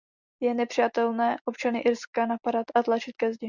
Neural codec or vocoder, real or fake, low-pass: none; real; 7.2 kHz